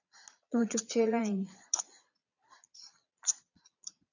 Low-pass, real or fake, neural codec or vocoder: 7.2 kHz; fake; vocoder, 22.05 kHz, 80 mel bands, Vocos